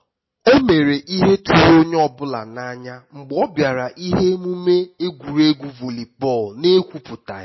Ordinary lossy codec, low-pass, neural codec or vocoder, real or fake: MP3, 24 kbps; 7.2 kHz; none; real